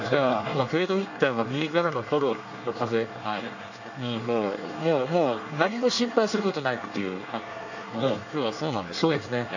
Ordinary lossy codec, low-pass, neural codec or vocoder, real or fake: none; 7.2 kHz; codec, 24 kHz, 1 kbps, SNAC; fake